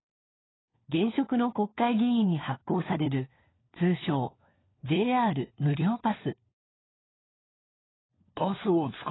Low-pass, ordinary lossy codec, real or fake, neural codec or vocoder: 7.2 kHz; AAC, 16 kbps; fake; codec, 16 kHz, 4 kbps, FunCodec, trained on LibriTTS, 50 frames a second